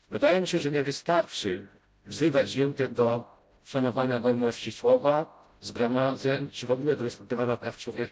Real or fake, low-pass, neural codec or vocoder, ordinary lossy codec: fake; none; codec, 16 kHz, 0.5 kbps, FreqCodec, smaller model; none